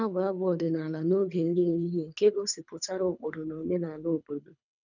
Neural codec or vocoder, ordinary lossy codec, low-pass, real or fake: codec, 24 kHz, 3 kbps, HILCodec; none; 7.2 kHz; fake